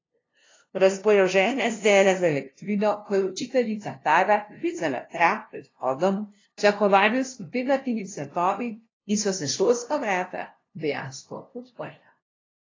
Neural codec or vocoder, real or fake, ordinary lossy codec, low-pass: codec, 16 kHz, 0.5 kbps, FunCodec, trained on LibriTTS, 25 frames a second; fake; AAC, 32 kbps; 7.2 kHz